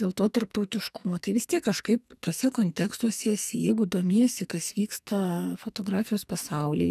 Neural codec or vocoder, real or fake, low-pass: codec, 44.1 kHz, 2.6 kbps, SNAC; fake; 14.4 kHz